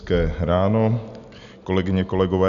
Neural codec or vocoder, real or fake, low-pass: none; real; 7.2 kHz